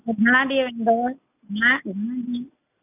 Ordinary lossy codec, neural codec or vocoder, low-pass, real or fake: none; none; 3.6 kHz; real